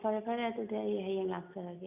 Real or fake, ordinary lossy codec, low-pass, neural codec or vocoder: real; none; 3.6 kHz; none